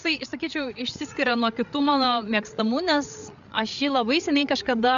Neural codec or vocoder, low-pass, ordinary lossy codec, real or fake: codec, 16 kHz, 8 kbps, FreqCodec, larger model; 7.2 kHz; AAC, 96 kbps; fake